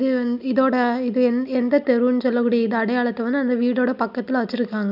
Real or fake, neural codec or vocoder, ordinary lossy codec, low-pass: real; none; none; 5.4 kHz